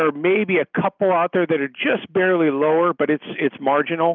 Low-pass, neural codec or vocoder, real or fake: 7.2 kHz; none; real